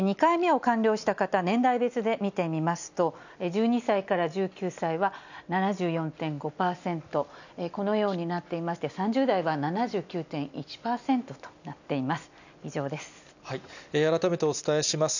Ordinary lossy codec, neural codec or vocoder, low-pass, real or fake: none; none; 7.2 kHz; real